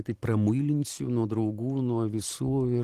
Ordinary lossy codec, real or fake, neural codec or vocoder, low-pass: Opus, 16 kbps; fake; vocoder, 44.1 kHz, 128 mel bands every 512 samples, BigVGAN v2; 14.4 kHz